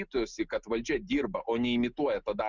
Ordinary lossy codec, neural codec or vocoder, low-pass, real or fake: Opus, 64 kbps; none; 7.2 kHz; real